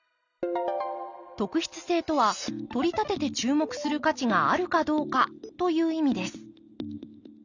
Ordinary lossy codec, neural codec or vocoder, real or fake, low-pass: none; none; real; 7.2 kHz